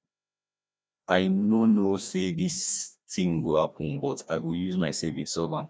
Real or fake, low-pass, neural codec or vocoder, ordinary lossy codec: fake; none; codec, 16 kHz, 1 kbps, FreqCodec, larger model; none